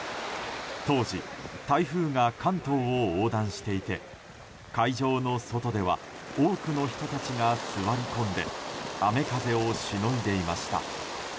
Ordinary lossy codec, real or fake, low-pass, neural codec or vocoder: none; real; none; none